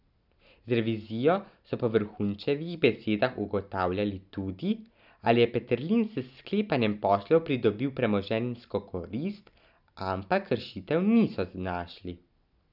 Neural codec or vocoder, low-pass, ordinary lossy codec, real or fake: none; 5.4 kHz; none; real